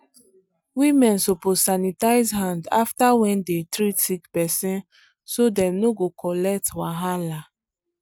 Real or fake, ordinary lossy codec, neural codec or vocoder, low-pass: real; none; none; none